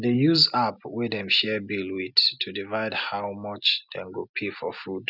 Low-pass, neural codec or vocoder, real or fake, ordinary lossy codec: 5.4 kHz; none; real; none